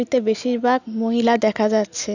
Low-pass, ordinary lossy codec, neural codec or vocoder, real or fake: 7.2 kHz; none; none; real